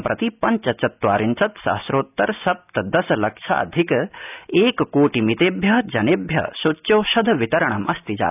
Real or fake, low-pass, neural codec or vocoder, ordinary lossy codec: real; 3.6 kHz; none; none